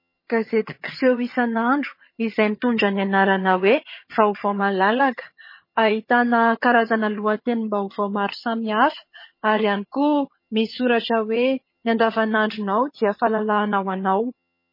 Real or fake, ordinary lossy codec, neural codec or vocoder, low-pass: fake; MP3, 24 kbps; vocoder, 22.05 kHz, 80 mel bands, HiFi-GAN; 5.4 kHz